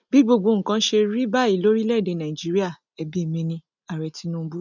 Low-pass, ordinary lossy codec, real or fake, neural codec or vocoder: 7.2 kHz; none; real; none